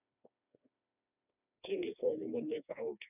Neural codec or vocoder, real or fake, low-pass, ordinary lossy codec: codec, 16 kHz, 2 kbps, FreqCodec, smaller model; fake; 3.6 kHz; none